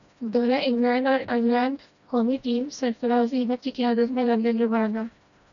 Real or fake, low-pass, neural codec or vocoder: fake; 7.2 kHz; codec, 16 kHz, 1 kbps, FreqCodec, smaller model